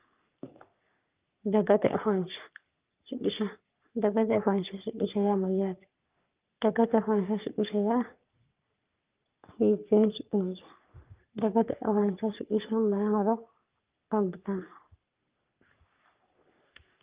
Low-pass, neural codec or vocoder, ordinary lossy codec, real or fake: 3.6 kHz; codec, 44.1 kHz, 3.4 kbps, Pupu-Codec; Opus, 24 kbps; fake